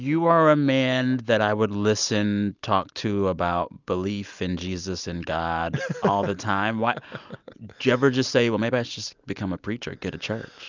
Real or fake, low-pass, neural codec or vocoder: real; 7.2 kHz; none